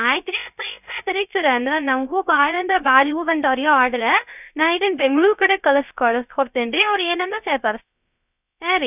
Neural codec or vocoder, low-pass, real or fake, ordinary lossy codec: codec, 16 kHz, 0.3 kbps, FocalCodec; 3.6 kHz; fake; none